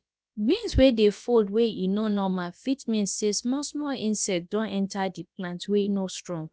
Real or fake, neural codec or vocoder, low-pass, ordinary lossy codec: fake; codec, 16 kHz, about 1 kbps, DyCAST, with the encoder's durations; none; none